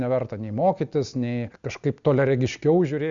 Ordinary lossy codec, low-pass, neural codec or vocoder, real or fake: Opus, 64 kbps; 7.2 kHz; none; real